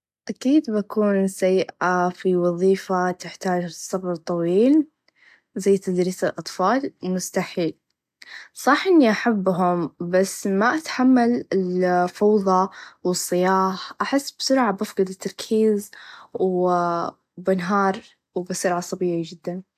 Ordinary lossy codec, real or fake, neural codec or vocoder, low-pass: AAC, 96 kbps; real; none; 14.4 kHz